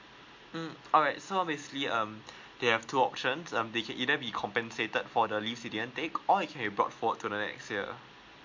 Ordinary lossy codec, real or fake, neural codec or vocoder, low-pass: MP3, 48 kbps; real; none; 7.2 kHz